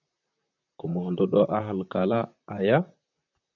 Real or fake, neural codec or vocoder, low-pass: fake; vocoder, 44.1 kHz, 128 mel bands, Pupu-Vocoder; 7.2 kHz